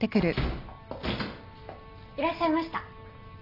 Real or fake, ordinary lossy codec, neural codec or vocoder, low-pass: real; none; none; 5.4 kHz